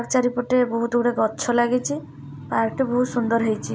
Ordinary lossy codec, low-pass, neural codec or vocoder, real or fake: none; none; none; real